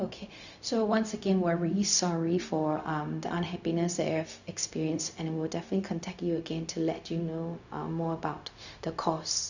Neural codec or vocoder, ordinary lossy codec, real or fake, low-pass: codec, 16 kHz, 0.4 kbps, LongCat-Audio-Codec; none; fake; 7.2 kHz